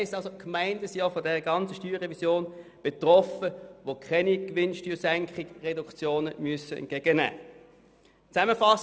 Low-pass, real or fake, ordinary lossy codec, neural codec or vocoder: none; real; none; none